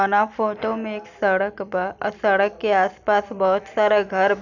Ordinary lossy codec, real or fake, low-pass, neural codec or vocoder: none; real; 7.2 kHz; none